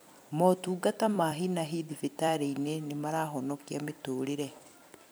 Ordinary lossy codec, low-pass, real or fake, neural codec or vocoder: none; none; fake; vocoder, 44.1 kHz, 128 mel bands every 256 samples, BigVGAN v2